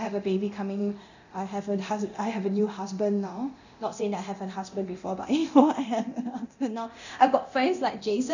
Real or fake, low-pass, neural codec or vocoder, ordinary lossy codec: fake; 7.2 kHz; codec, 24 kHz, 0.9 kbps, DualCodec; none